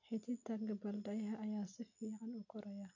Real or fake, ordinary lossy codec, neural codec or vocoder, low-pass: real; none; none; 7.2 kHz